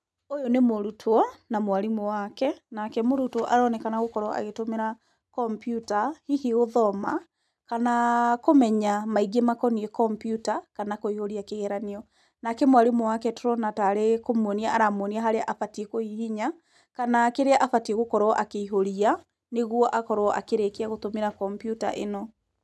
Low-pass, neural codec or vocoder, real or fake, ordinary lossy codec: none; none; real; none